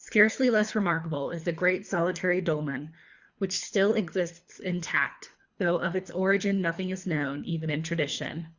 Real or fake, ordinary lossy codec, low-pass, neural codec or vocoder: fake; Opus, 64 kbps; 7.2 kHz; codec, 24 kHz, 3 kbps, HILCodec